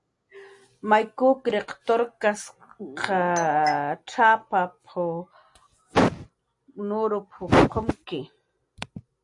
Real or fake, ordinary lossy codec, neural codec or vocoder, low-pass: real; AAC, 64 kbps; none; 10.8 kHz